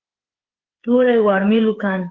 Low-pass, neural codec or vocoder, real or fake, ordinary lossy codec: 7.2 kHz; codec, 16 kHz, 8 kbps, FreqCodec, smaller model; fake; Opus, 16 kbps